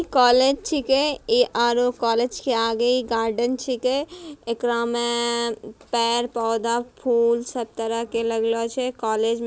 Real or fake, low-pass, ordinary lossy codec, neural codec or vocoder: real; none; none; none